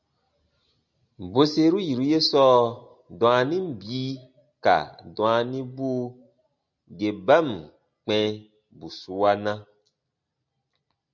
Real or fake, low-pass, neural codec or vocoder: real; 7.2 kHz; none